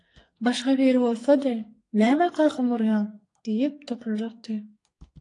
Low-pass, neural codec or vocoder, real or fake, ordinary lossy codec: 10.8 kHz; codec, 44.1 kHz, 2.6 kbps, SNAC; fake; AAC, 48 kbps